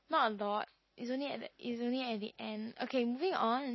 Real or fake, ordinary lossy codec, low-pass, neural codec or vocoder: real; MP3, 24 kbps; 7.2 kHz; none